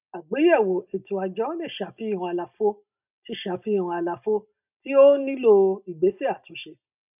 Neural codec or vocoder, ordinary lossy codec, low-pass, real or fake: none; none; 3.6 kHz; real